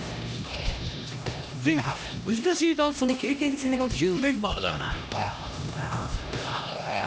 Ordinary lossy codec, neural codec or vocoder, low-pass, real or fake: none; codec, 16 kHz, 1 kbps, X-Codec, HuBERT features, trained on LibriSpeech; none; fake